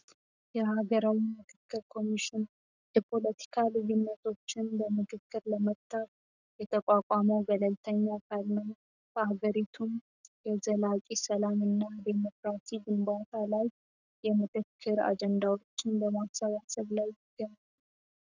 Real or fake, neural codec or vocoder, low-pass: fake; codec, 44.1 kHz, 7.8 kbps, Pupu-Codec; 7.2 kHz